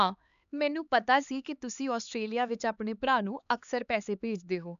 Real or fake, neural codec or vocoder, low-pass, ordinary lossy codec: fake; codec, 16 kHz, 4 kbps, X-Codec, HuBERT features, trained on LibriSpeech; 7.2 kHz; none